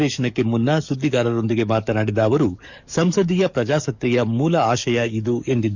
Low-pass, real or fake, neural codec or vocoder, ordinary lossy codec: 7.2 kHz; fake; codec, 44.1 kHz, 7.8 kbps, DAC; none